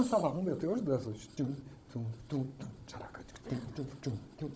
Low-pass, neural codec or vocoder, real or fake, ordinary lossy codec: none; codec, 16 kHz, 16 kbps, FunCodec, trained on Chinese and English, 50 frames a second; fake; none